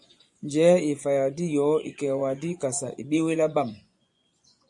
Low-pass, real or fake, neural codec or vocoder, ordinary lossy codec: 10.8 kHz; fake; vocoder, 24 kHz, 100 mel bands, Vocos; MP3, 64 kbps